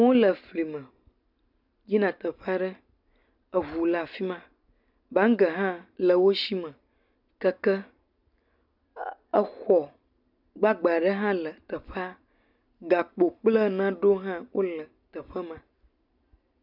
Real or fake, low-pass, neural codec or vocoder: real; 5.4 kHz; none